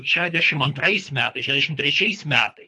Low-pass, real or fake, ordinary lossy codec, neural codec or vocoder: 10.8 kHz; fake; AAC, 64 kbps; codec, 24 kHz, 3 kbps, HILCodec